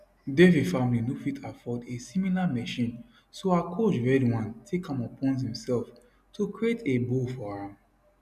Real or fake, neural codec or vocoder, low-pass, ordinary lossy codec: real; none; 14.4 kHz; none